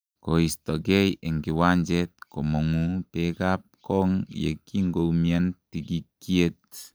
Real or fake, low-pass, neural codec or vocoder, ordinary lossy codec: real; none; none; none